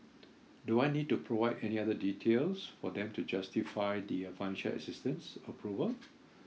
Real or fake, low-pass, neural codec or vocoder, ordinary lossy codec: real; none; none; none